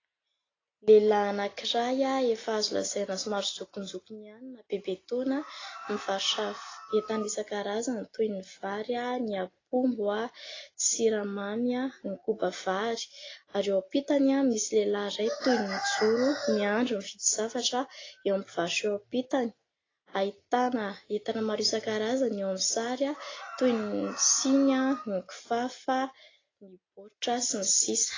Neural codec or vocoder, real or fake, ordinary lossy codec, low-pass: none; real; AAC, 32 kbps; 7.2 kHz